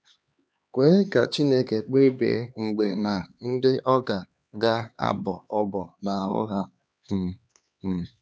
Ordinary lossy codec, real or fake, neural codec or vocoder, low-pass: none; fake; codec, 16 kHz, 2 kbps, X-Codec, HuBERT features, trained on LibriSpeech; none